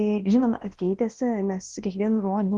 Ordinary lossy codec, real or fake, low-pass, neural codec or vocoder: Opus, 16 kbps; fake; 10.8 kHz; codec, 24 kHz, 0.9 kbps, WavTokenizer, large speech release